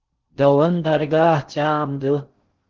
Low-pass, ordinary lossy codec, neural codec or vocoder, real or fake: 7.2 kHz; Opus, 16 kbps; codec, 16 kHz in and 24 kHz out, 0.6 kbps, FocalCodec, streaming, 4096 codes; fake